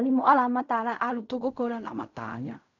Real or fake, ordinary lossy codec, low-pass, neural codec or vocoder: fake; none; 7.2 kHz; codec, 16 kHz in and 24 kHz out, 0.4 kbps, LongCat-Audio-Codec, fine tuned four codebook decoder